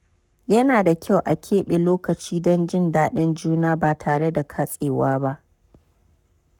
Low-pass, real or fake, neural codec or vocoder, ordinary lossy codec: 19.8 kHz; fake; codec, 44.1 kHz, 7.8 kbps, Pupu-Codec; none